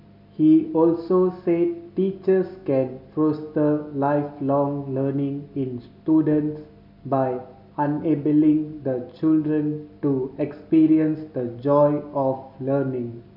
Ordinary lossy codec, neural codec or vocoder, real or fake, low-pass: none; none; real; 5.4 kHz